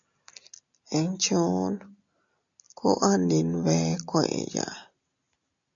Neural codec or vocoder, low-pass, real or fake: none; 7.2 kHz; real